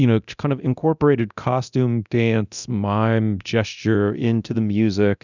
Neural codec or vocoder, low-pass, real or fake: codec, 24 kHz, 0.9 kbps, DualCodec; 7.2 kHz; fake